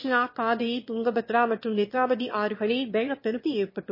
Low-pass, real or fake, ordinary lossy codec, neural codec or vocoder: 5.4 kHz; fake; MP3, 24 kbps; autoencoder, 22.05 kHz, a latent of 192 numbers a frame, VITS, trained on one speaker